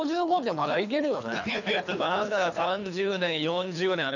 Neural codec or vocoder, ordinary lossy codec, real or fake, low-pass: codec, 24 kHz, 3 kbps, HILCodec; none; fake; 7.2 kHz